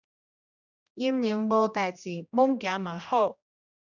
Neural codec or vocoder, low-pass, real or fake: codec, 16 kHz, 1 kbps, X-Codec, HuBERT features, trained on general audio; 7.2 kHz; fake